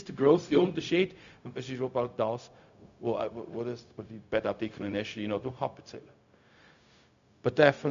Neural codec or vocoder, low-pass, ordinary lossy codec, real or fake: codec, 16 kHz, 0.4 kbps, LongCat-Audio-Codec; 7.2 kHz; MP3, 64 kbps; fake